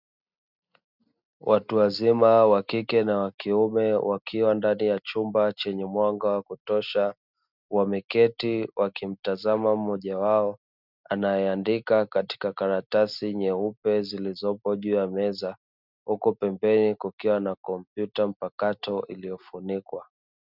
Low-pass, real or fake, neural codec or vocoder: 5.4 kHz; real; none